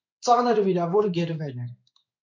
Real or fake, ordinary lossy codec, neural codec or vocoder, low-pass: fake; MP3, 48 kbps; codec, 16 kHz in and 24 kHz out, 1 kbps, XY-Tokenizer; 7.2 kHz